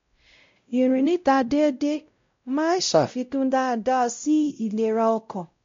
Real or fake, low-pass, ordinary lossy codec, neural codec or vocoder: fake; 7.2 kHz; MP3, 48 kbps; codec, 16 kHz, 0.5 kbps, X-Codec, WavLM features, trained on Multilingual LibriSpeech